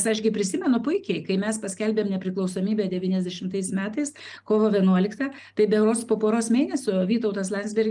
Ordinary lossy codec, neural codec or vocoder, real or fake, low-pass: Opus, 32 kbps; none; real; 10.8 kHz